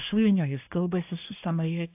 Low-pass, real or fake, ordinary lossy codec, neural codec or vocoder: 3.6 kHz; fake; AAC, 32 kbps; codec, 24 kHz, 1 kbps, SNAC